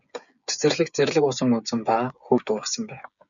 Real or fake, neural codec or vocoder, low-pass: fake; codec, 16 kHz, 8 kbps, FreqCodec, smaller model; 7.2 kHz